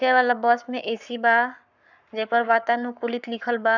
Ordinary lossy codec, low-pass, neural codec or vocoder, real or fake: none; 7.2 kHz; codec, 44.1 kHz, 7.8 kbps, Pupu-Codec; fake